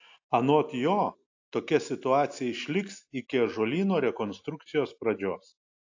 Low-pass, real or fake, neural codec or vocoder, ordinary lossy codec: 7.2 kHz; real; none; AAC, 48 kbps